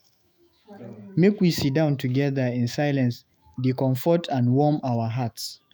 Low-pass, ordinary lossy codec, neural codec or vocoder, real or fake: none; none; autoencoder, 48 kHz, 128 numbers a frame, DAC-VAE, trained on Japanese speech; fake